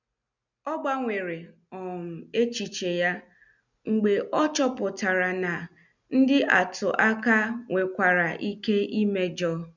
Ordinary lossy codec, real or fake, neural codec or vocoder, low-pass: none; real; none; 7.2 kHz